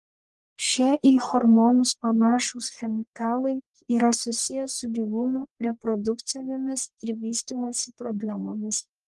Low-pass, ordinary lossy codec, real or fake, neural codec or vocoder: 10.8 kHz; Opus, 24 kbps; fake; codec, 44.1 kHz, 1.7 kbps, Pupu-Codec